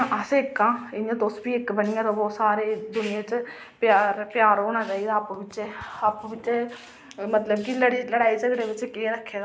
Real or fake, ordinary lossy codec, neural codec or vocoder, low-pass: real; none; none; none